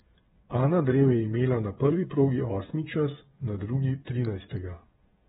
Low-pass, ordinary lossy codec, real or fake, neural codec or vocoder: 7.2 kHz; AAC, 16 kbps; fake; codec, 16 kHz, 8 kbps, FreqCodec, smaller model